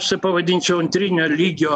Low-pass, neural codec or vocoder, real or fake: 10.8 kHz; none; real